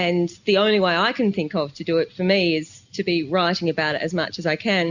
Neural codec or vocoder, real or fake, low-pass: none; real; 7.2 kHz